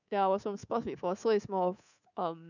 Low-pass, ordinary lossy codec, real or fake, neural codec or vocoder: 7.2 kHz; none; fake; codec, 24 kHz, 3.1 kbps, DualCodec